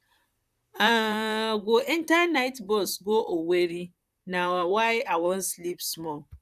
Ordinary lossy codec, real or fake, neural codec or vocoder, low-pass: none; fake; vocoder, 44.1 kHz, 128 mel bands, Pupu-Vocoder; 14.4 kHz